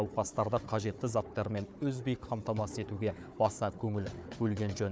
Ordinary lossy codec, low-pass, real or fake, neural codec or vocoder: none; none; fake; codec, 16 kHz, 4 kbps, FunCodec, trained on Chinese and English, 50 frames a second